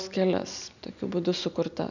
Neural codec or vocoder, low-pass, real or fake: none; 7.2 kHz; real